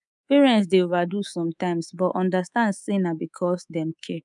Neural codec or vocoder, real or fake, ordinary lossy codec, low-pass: codec, 24 kHz, 3.1 kbps, DualCodec; fake; none; 10.8 kHz